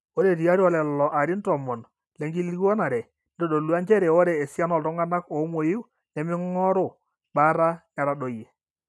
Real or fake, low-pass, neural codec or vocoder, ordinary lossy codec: real; none; none; none